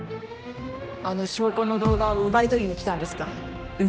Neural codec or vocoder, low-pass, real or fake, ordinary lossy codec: codec, 16 kHz, 1 kbps, X-Codec, HuBERT features, trained on general audio; none; fake; none